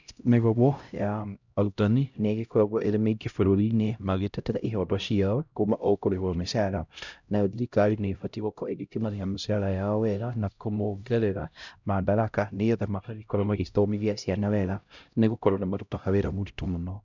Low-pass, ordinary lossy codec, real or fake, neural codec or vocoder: 7.2 kHz; none; fake; codec, 16 kHz, 0.5 kbps, X-Codec, HuBERT features, trained on LibriSpeech